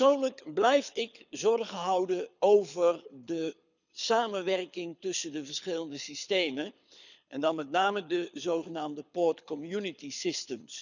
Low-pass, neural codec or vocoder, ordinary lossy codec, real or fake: 7.2 kHz; codec, 24 kHz, 6 kbps, HILCodec; none; fake